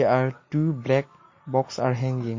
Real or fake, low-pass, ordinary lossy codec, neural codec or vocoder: real; 7.2 kHz; MP3, 32 kbps; none